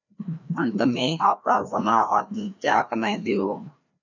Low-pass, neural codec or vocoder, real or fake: 7.2 kHz; codec, 16 kHz, 1 kbps, FreqCodec, larger model; fake